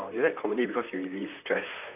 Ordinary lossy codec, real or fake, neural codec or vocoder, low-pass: none; fake; vocoder, 44.1 kHz, 128 mel bands, Pupu-Vocoder; 3.6 kHz